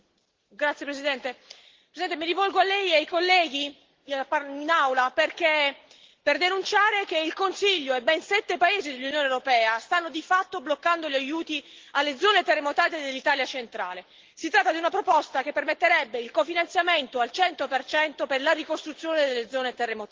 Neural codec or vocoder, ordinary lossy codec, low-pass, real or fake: none; Opus, 16 kbps; 7.2 kHz; real